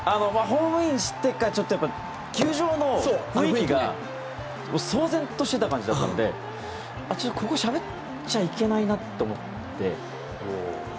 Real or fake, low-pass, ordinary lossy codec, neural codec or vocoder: real; none; none; none